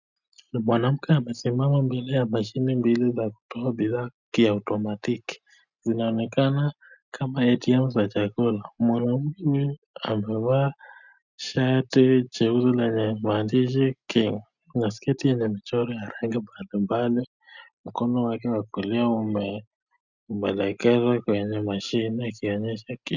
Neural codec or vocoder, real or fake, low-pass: none; real; 7.2 kHz